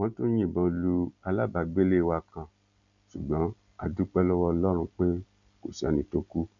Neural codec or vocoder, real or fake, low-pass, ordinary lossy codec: none; real; 7.2 kHz; MP3, 64 kbps